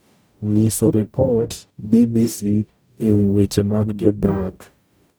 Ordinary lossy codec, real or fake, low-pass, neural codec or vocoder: none; fake; none; codec, 44.1 kHz, 0.9 kbps, DAC